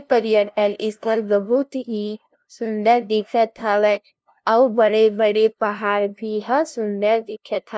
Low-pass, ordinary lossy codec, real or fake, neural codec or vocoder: none; none; fake; codec, 16 kHz, 0.5 kbps, FunCodec, trained on LibriTTS, 25 frames a second